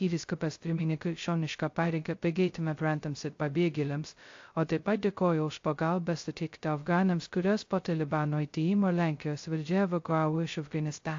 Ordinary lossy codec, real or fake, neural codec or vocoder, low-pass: AAC, 48 kbps; fake; codec, 16 kHz, 0.2 kbps, FocalCodec; 7.2 kHz